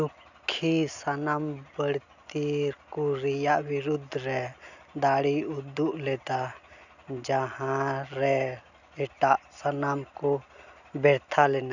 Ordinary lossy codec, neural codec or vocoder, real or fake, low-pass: none; none; real; 7.2 kHz